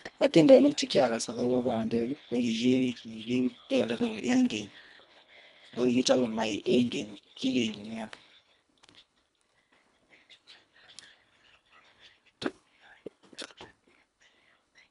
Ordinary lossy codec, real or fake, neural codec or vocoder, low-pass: none; fake; codec, 24 kHz, 1.5 kbps, HILCodec; 10.8 kHz